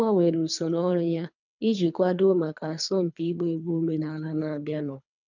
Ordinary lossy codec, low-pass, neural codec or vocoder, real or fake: none; 7.2 kHz; codec, 24 kHz, 3 kbps, HILCodec; fake